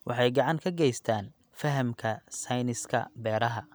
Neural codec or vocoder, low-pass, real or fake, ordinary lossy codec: none; none; real; none